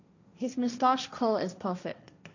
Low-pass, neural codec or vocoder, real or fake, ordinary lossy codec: 7.2 kHz; codec, 16 kHz, 1.1 kbps, Voila-Tokenizer; fake; none